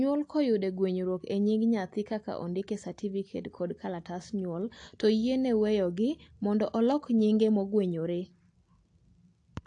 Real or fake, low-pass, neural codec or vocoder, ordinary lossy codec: real; 9.9 kHz; none; AAC, 48 kbps